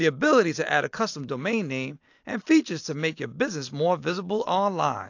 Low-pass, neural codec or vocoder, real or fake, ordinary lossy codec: 7.2 kHz; none; real; AAC, 48 kbps